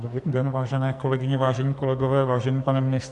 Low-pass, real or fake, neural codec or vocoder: 10.8 kHz; fake; codec, 44.1 kHz, 2.6 kbps, SNAC